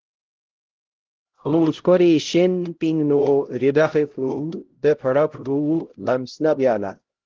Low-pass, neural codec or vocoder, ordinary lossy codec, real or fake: 7.2 kHz; codec, 16 kHz, 0.5 kbps, X-Codec, HuBERT features, trained on LibriSpeech; Opus, 16 kbps; fake